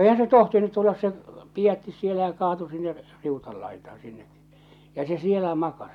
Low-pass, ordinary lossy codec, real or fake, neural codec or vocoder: 19.8 kHz; none; real; none